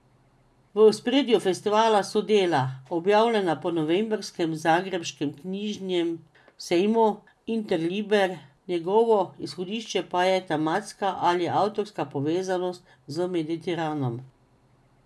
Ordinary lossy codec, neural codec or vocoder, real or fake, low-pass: none; none; real; none